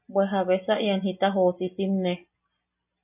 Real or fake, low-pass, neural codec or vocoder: real; 3.6 kHz; none